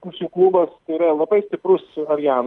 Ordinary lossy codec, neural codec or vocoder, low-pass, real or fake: Opus, 32 kbps; none; 10.8 kHz; real